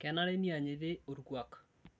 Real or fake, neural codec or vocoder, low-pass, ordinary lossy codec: real; none; none; none